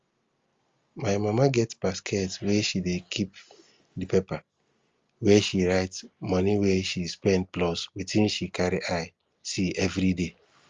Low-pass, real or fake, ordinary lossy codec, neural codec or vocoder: 7.2 kHz; real; Opus, 32 kbps; none